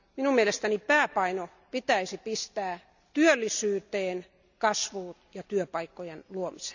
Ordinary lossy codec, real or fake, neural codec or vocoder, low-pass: none; real; none; 7.2 kHz